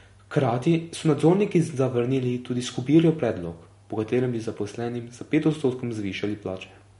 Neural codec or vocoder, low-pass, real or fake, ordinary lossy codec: none; 10.8 kHz; real; MP3, 48 kbps